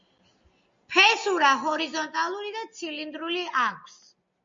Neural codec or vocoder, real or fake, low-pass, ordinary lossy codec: none; real; 7.2 kHz; MP3, 48 kbps